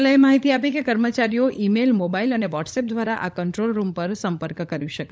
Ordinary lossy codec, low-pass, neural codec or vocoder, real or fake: none; none; codec, 16 kHz, 16 kbps, FunCodec, trained on LibriTTS, 50 frames a second; fake